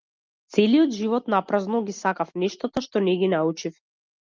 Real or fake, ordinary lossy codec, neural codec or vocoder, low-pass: real; Opus, 24 kbps; none; 7.2 kHz